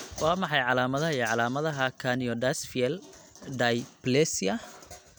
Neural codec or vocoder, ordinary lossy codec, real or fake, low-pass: none; none; real; none